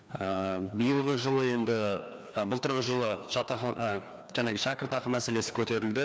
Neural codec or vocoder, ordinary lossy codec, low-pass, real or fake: codec, 16 kHz, 2 kbps, FreqCodec, larger model; none; none; fake